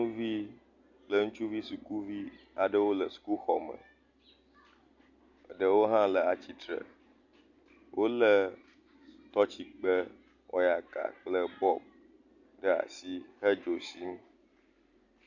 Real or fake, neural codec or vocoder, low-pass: real; none; 7.2 kHz